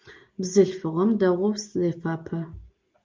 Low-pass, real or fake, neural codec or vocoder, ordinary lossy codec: 7.2 kHz; real; none; Opus, 24 kbps